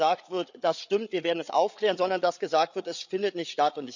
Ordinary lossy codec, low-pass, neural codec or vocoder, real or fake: none; 7.2 kHz; codec, 16 kHz, 8 kbps, FreqCodec, larger model; fake